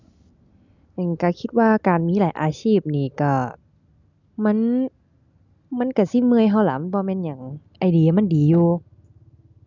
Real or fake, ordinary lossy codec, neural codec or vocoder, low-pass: real; none; none; 7.2 kHz